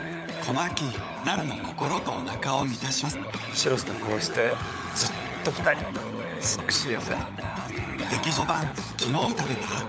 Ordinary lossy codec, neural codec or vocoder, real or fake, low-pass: none; codec, 16 kHz, 16 kbps, FunCodec, trained on LibriTTS, 50 frames a second; fake; none